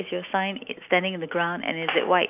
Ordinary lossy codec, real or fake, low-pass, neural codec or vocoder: none; real; 3.6 kHz; none